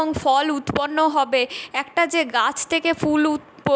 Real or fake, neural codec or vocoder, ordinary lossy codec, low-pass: real; none; none; none